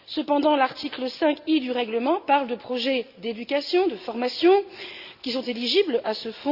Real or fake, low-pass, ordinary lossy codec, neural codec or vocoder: real; 5.4 kHz; Opus, 64 kbps; none